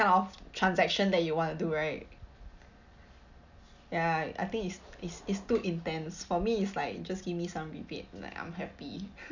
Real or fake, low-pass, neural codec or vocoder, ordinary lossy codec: real; 7.2 kHz; none; none